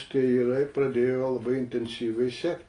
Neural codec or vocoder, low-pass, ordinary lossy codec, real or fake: none; 9.9 kHz; AAC, 32 kbps; real